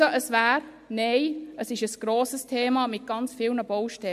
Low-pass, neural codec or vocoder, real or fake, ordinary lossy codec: 14.4 kHz; none; real; MP3, 64 kbps